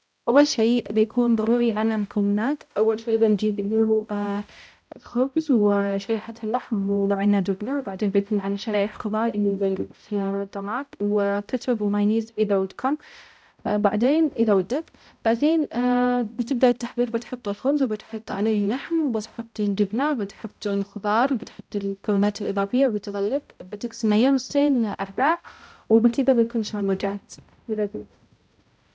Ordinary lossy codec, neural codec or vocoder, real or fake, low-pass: none; codec, 16 kHz, 0.5 kbps, X-Codec, HuBERT features, trained on balanced general audio; fake; none